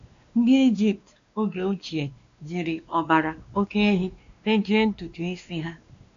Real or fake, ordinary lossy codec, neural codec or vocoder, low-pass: fake; MP3, 64 kbps; codec, 16 kHz, 2 kbps, X-Codec, WavLM features, trained on Multilingual LibriSpeech; 7.2 kHz